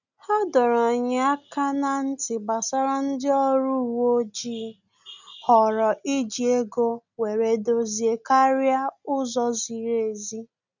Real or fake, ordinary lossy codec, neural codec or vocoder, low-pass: real; none; none; 7.2 kHz